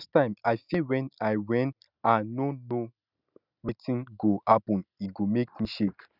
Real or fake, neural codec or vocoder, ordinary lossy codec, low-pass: real; none; none; 5.4 kHz